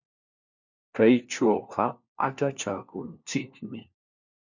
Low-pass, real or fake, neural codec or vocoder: 7.2 kHz; fake; codec, 16 kHz, 1 kbps, FunCodec, trained on LibriTTS, 50 frames a second